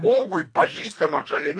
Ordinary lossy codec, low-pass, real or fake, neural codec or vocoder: AAC, 48 kbps; 9.9 kHz; fake; codec, 24 kHz, 1.5 kbps, HILCodec